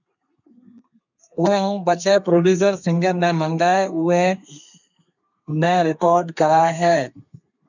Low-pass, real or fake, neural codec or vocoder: 7.2 kHz; fake; codec, 32 kHz, 1.9 kbps, SNAC